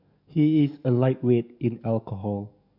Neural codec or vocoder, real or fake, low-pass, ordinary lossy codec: codec, 44.1 kHz, 7.8 kbps, DAC; fake; 5.4 kHz; none